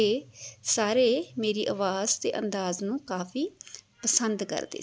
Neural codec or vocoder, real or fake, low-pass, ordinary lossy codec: none; real; none; none